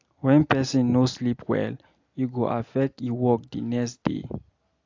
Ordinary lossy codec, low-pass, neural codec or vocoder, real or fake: AAC, 48 kbps; 7.2 kHz; none; real